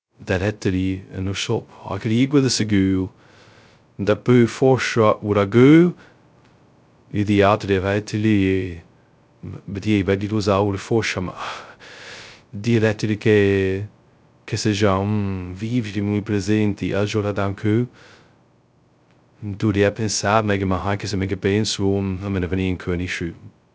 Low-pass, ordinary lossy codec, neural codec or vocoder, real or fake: none; none; codec, 16 kHz, 0.2 kbps, FocalCodec; fake